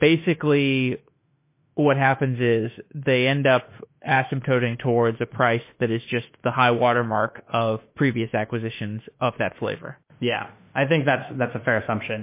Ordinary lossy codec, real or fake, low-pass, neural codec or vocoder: MP3, 24 kbps; fake; 3.6 kHz; autoencoder, 48 kHz, 32 numbers a frame, DAC-VAE, trained on Japanese speech